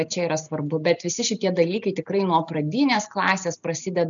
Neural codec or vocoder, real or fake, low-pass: none; real; 7.2 kHz